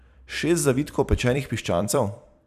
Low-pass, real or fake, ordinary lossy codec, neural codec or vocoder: 14.4 kHz; fake; none; vocoder, 44.1 kHz, 128 mel bands every 256 samples, BigVGAN v2